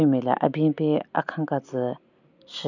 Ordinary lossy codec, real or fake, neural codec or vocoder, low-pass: none; real; none; 7.2 kHz